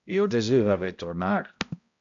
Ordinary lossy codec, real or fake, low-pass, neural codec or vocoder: MP3, 64 kbps; fake; 7.2 kHz; codec, 16 kHz, 0.5 kbps, X-Codec, HuBERT features, trained on balanced general audio